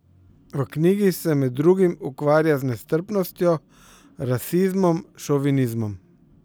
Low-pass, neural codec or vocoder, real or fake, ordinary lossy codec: none; none; real; none